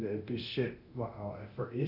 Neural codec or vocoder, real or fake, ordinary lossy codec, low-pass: codec, 24 kHz, 0.9 kbps, DualCodec; fake; none; 5.4 kHz